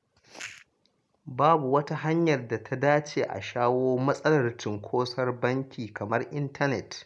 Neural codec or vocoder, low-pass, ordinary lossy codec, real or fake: none; none; none; real